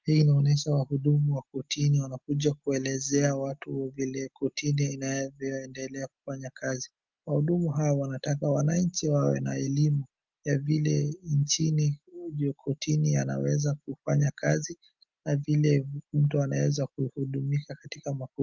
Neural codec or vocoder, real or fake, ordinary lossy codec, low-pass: none; real; Opus, 32 kbps; 7.2 kHz